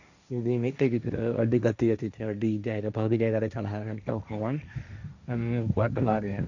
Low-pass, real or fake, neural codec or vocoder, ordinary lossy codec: 7.2 kHz; fake; codec, 16 kHz, 1.1 kbps, Voila-Tokenizer; none